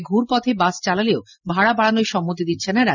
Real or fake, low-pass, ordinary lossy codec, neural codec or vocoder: real; 7.2 kHz; none; none